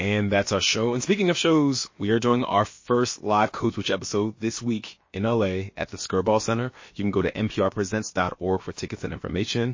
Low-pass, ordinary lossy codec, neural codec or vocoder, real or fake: 7.2 kHz; MP3, 32 kbps; codec, 16 kHz, about 1 kbps, DyCAST, with the encoder's durations; fake